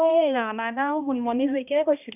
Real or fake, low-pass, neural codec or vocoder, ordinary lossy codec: fake; 3.6 kHz; codec, 16 kHz, 1 kbps, X-Codec, HuBERT features, trained on balanced general audio; none